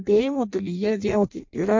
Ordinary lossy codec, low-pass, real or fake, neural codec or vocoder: MP3, 48 kbps; 7.2 kHz; fake; codec, 16 kHz in and 24 kHz out, 0.6 kbps, FireRedTTS-2 codec